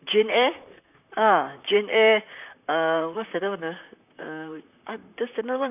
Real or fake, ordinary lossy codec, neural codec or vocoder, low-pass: fake; none; vocoder, 44.1 kHz, 128 mel bands, Pupu-Vocoder; 3.6 kHz